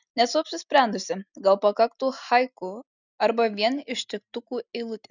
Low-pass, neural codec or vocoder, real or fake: 7.2 kHz; none; real